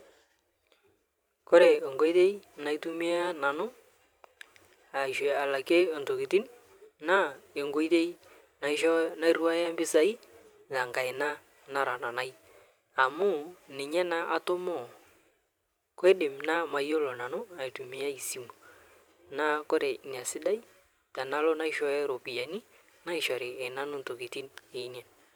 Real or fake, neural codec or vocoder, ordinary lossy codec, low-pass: fake; vocoder, 44.1 kHz, 128 mel bands every 512 samples, BigVGAN v2; none; none